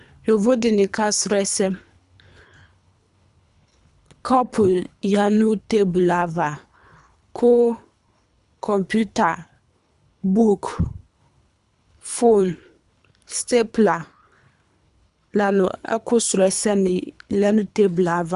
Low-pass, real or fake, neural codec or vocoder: 10.8 kHz; fake; codec, 24 kHz, 3 kbps, HILCodec